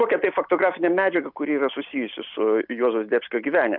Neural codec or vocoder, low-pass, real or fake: none; 5.4 kHz; real